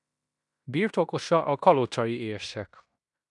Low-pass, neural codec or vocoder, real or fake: 10.8 kHz; codec, 16 kHz in and 24 kHz out, 0.9 kbps, LongCat-Audio-Codec, fine tuned four codebook decoder; fake